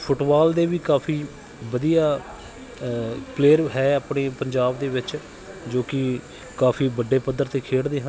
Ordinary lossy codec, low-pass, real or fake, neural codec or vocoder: none; none; real; none